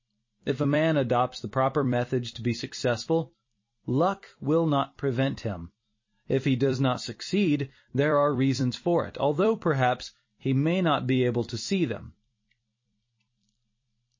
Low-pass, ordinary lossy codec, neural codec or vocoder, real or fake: 7.2 kHz; MP3, 32 kbps; vocoder, 44.1 kHz, 128 mel bands every 256 samples, BigVGAN v2; fake